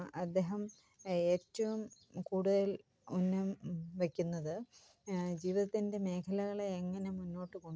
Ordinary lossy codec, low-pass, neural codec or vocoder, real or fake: none; none; none; real